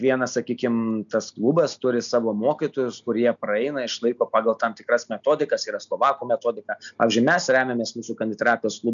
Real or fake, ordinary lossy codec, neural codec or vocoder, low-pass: real; MP3, 96 kbps; none; 7.2 kHz